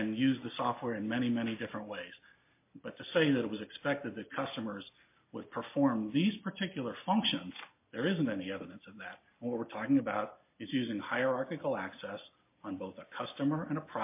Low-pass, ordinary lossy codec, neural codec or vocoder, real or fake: 3.6 kHz; MP3, 24 kbps; none; real